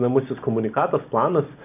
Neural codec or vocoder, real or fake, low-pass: none; real; 3.6 kHz